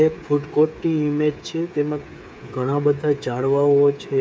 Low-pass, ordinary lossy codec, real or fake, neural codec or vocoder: none; none; fake; codec, 16 kHz, 8 kbps, FreqCodec, smaller model